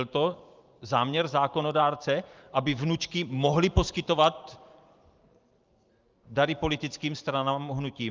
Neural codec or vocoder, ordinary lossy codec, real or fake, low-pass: vocoder, 44.1 kHz, 128 mel bands every 512 samples, BigVGAN v2; Opus, 24 kbps; fake; 7.2 kHz